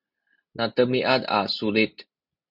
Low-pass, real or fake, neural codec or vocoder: 5.4 kHz; real; none